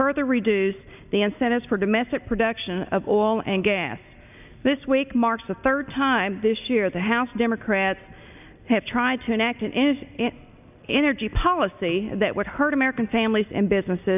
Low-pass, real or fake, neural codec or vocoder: 3.6 kHz; real; none